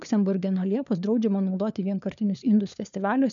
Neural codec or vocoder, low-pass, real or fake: codec, 16 kHz, 4 kbps, FunCodec, trained on LibriTTS, 50 frames a second; 7.2 kHz; fake